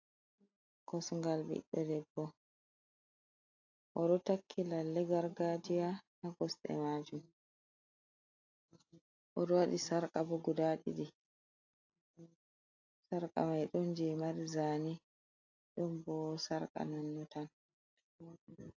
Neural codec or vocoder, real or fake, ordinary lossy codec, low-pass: none; real; AAC, 48 kbps; 7.2 kHz